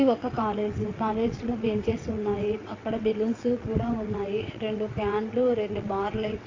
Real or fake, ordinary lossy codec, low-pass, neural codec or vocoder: fake; AAC, 32 kbps; 7.2 kHz; vocoder, 22.05 kHz, 80 mel bands, WaveNeXt